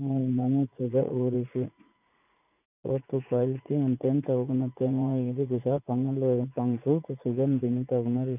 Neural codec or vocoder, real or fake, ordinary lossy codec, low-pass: vocoder, 44.1 kHz, 80 mel bands, Vocos; fake; none; 3.6 kHz